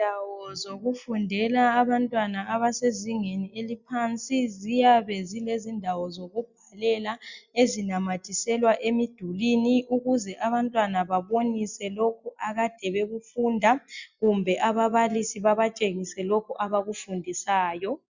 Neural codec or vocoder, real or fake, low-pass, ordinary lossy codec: none; real; 7.2 kHz; Opus, 64 kbps